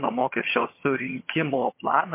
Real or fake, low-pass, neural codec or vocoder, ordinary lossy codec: fake; 3.6 kHz; vocoder, 22.05 kHz, 80 mel bands, HiFi-GAN; MP3, 24 kbps